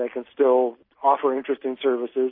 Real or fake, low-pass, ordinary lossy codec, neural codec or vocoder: real; 5.4 kHz; MP3, 24 kbps; none